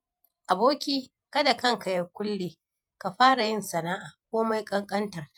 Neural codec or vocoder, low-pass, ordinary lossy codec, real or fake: vocoder, 48 kHz, 128 mel bands, Vocos; none; none; fake